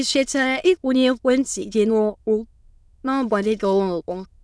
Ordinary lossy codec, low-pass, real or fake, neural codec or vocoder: none; none; fake; autoencoder, 22.05 kHz, a latent of 192 numbers a frame, VITS, trained on many speakers